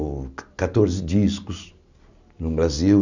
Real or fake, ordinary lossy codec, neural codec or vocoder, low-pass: real; none; none; 7.2 kHz